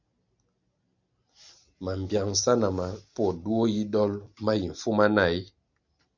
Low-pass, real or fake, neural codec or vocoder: 7.2 kHz; real; none